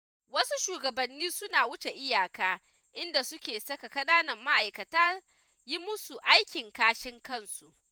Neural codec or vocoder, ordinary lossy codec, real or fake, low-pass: vocoder, 48 kHz, 128 mel bands, Vocos; none; fake; none